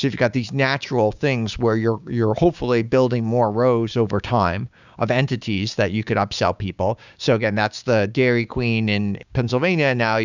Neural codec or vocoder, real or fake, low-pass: codec, 16 kHz, 6 kbps, DAC; fake; 7.2 kHz